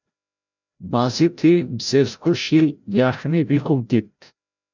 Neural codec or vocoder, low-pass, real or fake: codec, 16 kHz, 0.5 kbps, FreqCodec, larger model; 7.2 kHz; fake